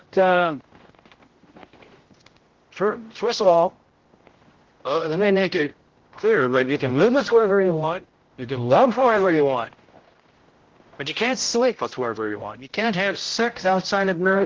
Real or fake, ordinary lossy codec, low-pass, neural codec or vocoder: fake; Opus, 16 kbps; 7.2 kHz; codec, 16 kHz, 0.5 kbps, X-Codec, HuBERT features, trained on general audio